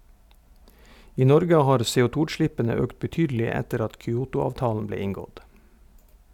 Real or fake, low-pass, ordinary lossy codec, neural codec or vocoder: real; 19.8 kHz; none; none